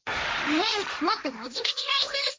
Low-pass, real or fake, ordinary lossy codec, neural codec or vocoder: none; fake; none; codec, 16 kHz, 1.1 kbps, Voila-Tokenizer